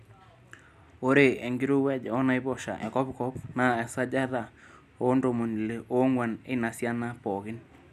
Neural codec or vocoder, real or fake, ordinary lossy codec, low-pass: none; real; none; 14.4 kHz